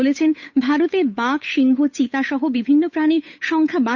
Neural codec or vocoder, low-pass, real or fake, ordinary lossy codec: codec, 16 kHz, 8 kbps, FunCodec, trained on Chinese and English, 25 frames a second; 7.2 kHz; fake; Opus, 64 kbps